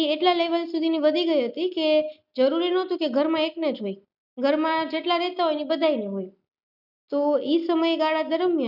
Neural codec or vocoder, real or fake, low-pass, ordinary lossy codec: none; real; 5.4 kHz; none